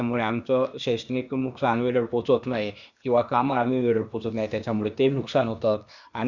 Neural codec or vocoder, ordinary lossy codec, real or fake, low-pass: codec, 16 kHz, 0.8 kbps, ZipCodec; none; fake; 7.2 kHz